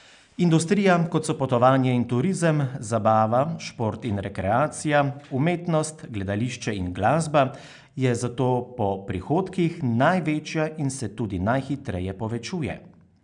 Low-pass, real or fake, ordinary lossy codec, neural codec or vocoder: 9.9 kHz; real; none; none